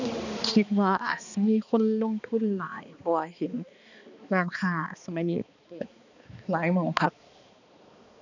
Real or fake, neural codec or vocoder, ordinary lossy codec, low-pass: fake; codec, 16 kHz, 2 kbps, X-Codec, HuBERT features, trained on balanced general audio; none; 7.2 kHz